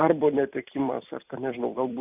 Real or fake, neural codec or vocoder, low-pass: fake; vocoder, 44.1 kHz, 128 mel bands every 256 samples, BigVGAN v2; 3.6 kHz